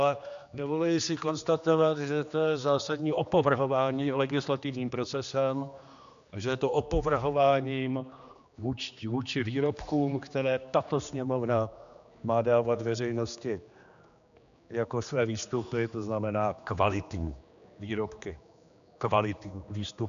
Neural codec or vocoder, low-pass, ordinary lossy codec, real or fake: codec, 16 kHz, 2 kbps, X-Codec, HuBERT features, trained on general audio; 7.2 kHz; AAC, 96 kbps; fake